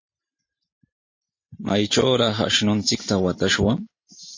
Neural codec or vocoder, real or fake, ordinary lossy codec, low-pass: none; real; MP3, 32 kbps; 7.2 kHz